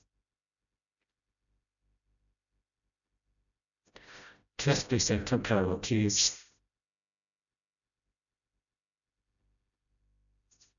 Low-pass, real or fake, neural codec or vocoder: 7.2 kHz; fake; codec, 16 kHz, 0.5 kbps, FreqCodec, smaller model